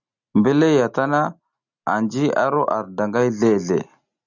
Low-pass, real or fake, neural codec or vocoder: 7.2 kHz; real; none